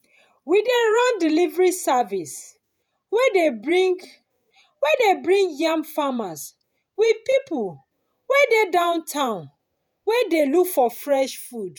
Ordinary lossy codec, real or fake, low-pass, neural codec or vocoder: none; fake; none; vocoder, 48 kHz, 128 mel bands, Vocos